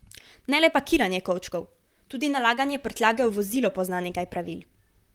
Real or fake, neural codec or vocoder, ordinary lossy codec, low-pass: fake; vocoder, 44.1 kHz, 128 mel bands, Pupu-Vocoder; Opus, 32 kbps; 19.8 kHz